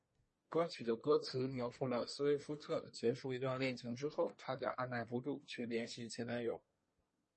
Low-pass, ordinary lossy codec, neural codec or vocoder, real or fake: 10.8 kHz; MP3, 32 kbps; codec, 24 kHz, 1 kbps, SNAC; fake